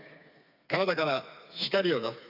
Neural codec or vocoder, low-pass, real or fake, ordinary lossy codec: codec, 16 kHz, 4 kbps, FreqCodec, smaller model; 5.4 kHz; fake; none